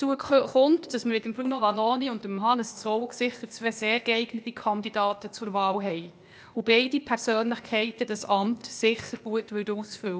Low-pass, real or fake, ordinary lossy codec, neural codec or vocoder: none; fake; none; codec, 16 kHz, 0.8 kbps, ZipCodec